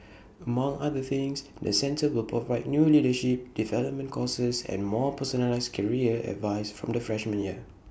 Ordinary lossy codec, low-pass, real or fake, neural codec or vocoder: none; none; real; none